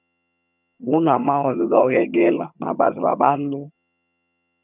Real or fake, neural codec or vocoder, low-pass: fake; vocoder, 22.05 kHz, 80 mel bands, HiFi-GAN; 3.6 kHz